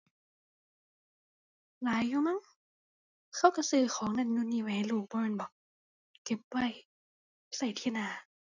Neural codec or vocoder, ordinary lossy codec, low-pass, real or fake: none; none; 7.2 kHz; real